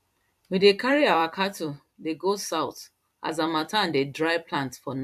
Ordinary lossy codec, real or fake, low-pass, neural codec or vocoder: AAC, 96 kbps; fake; 14.4 kHz; vocoder, 44.1 kHz, 128 mel bands every 256 samples, BigVGAN v2